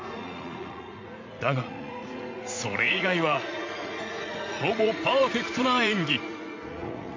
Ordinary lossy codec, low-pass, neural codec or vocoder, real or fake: MP3, 48 kbps; 7.2 kHz; vocoder, 44.1 kHz, 80 mel bands, Vocos; fake